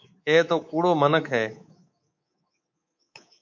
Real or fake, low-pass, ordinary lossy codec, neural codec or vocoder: fake; 7.2 kHz; MP3, 48 kbps; codec, 24 kHz, 3.1 kbps, DualCodec